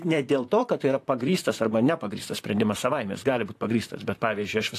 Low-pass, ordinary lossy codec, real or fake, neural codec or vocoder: 14.4 kHz; AAC, 64 kbps; fake; vocoder, 44.1 kHz, 128 mel bands, Pupu-Vocoder